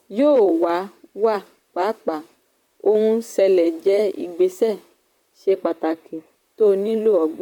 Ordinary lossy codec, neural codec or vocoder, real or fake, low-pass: none; vocoder, 44.1 kHz, 128 mel bands, Pupu-Vocoder; fake; 19.8 kHz